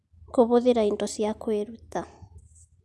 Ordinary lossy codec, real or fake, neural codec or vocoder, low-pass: none; real; none; none